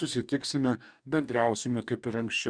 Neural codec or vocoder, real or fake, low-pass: codec, 44.1 kHz, 2.6 kbps, DAC; fake; 9.9 kHz